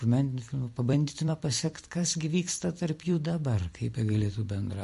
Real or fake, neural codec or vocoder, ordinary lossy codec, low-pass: fake; vocoder, 44.1 kHz, 128 mel bands every 256 samples, BigVGAN v2; MP3, 48 kbps; 14.4 kHz